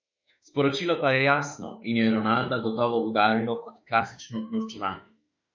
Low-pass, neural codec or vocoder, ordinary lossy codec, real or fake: 7.2 kHz; autoencoder, 48 kHz, 32 numbers a frame, DAC-VAE, trained on Japanese speech; MP3, 64 kbps; fake